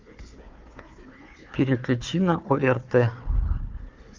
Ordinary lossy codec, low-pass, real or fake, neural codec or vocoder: Opus, 32 kbps; 7.2 kHz; fake; codec, 16 kHz, 4 kbps, FunCodec, trained on Chinese and English, 50 frames a second